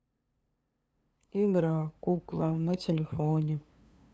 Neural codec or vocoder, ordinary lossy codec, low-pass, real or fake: codec, 16 kHz, 2 kbps, FunCodec, trained on LibriTTS, 25 frames a second; none; none; fake